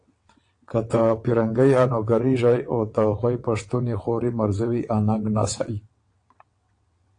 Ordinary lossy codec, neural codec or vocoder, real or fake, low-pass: AAC, 48 kbps; vocoder, 22.05 kHz, 80 mel bands, WaveNeXt; fake; 9.9 kHz